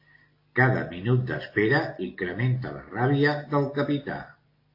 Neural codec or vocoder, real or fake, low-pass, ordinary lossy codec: none; real; 5.4 kHz; AAC, 32 kbps